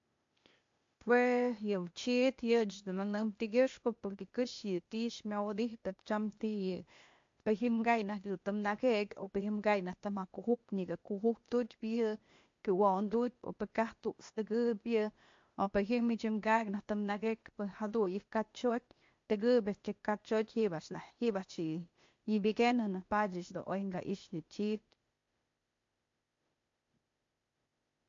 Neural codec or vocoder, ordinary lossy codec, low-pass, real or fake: codec, 16 kHz, 0.8 kbps, ZipCodec; MP3, 48 kbps; 7.2 kHz; fake